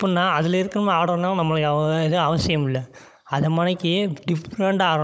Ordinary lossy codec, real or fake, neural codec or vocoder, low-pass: none; fake; codec, 16 kHz, 16 kbps, FunCodec, trained on Chinese and English, 50 frames a second; none